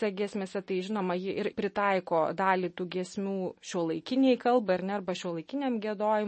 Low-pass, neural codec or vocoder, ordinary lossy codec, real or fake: 10.8 kHz; none; MP3, 32 kbps; real